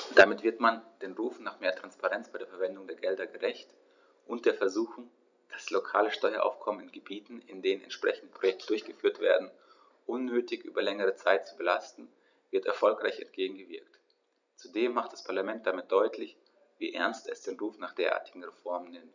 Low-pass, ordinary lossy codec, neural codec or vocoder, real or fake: 7.2 kHz; none; none; real